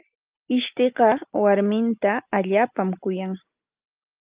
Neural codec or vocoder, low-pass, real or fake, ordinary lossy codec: none; 3.6 kHz; real; Opus, 32 kbps